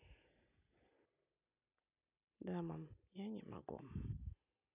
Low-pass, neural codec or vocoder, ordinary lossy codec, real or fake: 3.6 kHz; none; none; real